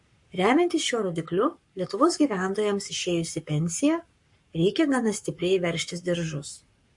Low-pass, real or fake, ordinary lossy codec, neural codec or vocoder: 10.8 kHz; fake; MP3, 48 kbps; codec, 44.1 kHz, 7.8 kbps, Pupu-Codec